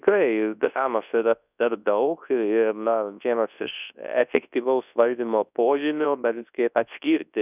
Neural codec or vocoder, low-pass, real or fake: codec, 24 kHz, 0.9 kbps, WavTokenizer, large speech release; 3.6 kHz; fake